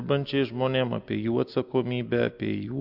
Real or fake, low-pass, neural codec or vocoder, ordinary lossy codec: real; 5.4 kHz; none; MP3, 48 kbps